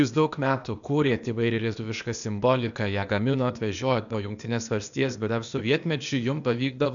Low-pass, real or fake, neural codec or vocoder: 7.2 kHz; fake; codec, 16 kHz, 0.8 kbps, ZipCodec